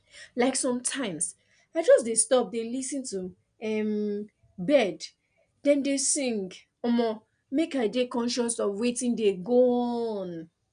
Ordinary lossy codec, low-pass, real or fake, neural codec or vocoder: none; 9.9 kHz; real; none